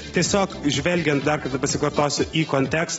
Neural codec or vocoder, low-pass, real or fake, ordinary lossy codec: none; 19.8 kHz; real; AAC, 24 kbps